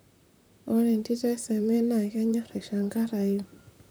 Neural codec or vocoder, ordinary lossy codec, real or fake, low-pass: vocoder, 44.1 kHz, 128 mel bands, Pupu-Vocoder; none; fake; none